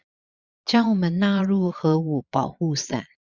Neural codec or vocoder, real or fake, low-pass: vocoder, 22.05 kHz, 80 mel bands, Vocos; fake; 7.2 kHz